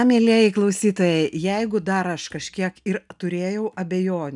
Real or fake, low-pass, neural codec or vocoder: real; 10.8 kHz; none